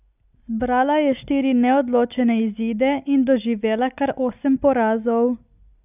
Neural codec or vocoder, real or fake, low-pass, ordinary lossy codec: none; real; 3.6 kHz; none